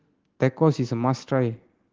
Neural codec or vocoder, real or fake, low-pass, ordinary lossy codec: none; real; 7.2 kHz; Opus, 16 kbps